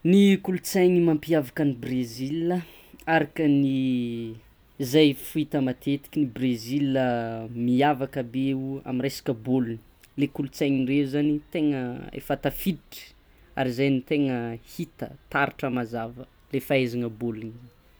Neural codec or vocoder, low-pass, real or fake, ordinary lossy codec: none; none; real; none